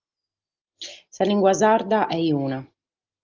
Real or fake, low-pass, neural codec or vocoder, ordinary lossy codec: real; 7.2 kHz; none; Opus, 24 kbps